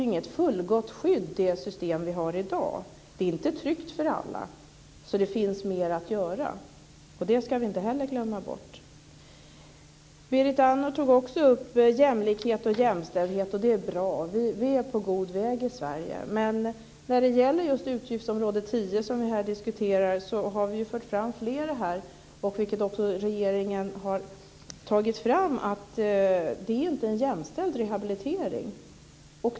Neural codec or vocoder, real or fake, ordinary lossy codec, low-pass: none; real; none; none